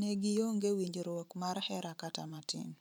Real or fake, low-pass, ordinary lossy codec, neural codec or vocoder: fake; none; none; vocoder, 44.1 kHz, 128 mel bands every 512 samples, BigVGAN v2